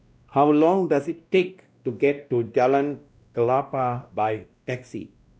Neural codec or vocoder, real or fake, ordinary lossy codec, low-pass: codec, 16 kHz, 1 kbps, X-Codec, WavLM features, trained on Multilingual LibriSpeech; fake; none; none